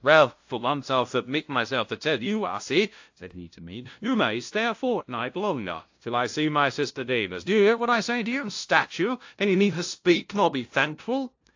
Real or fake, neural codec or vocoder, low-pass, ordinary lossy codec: fake; codec, 16 kHz, 0.5 kbps, FunCodec, trained on LibriTTS, 25 frames a second; 7.2 kHz; AAC, 48 kbps